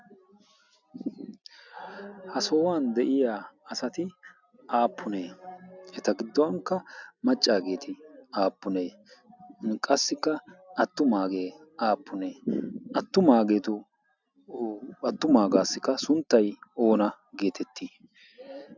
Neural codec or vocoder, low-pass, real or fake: none; 7.2 kHz; real